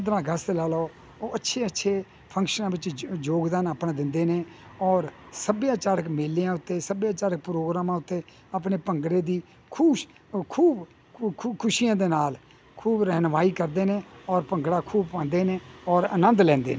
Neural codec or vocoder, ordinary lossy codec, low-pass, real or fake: none; none; none; real